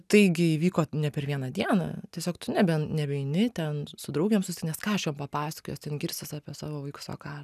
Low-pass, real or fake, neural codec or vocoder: 14.4 kHz; real; none